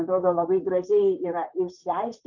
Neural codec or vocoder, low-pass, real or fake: vocoder, 44.1 kHz, 128 mel bands, Pupu-Vocoder; 7.2 kHz; fake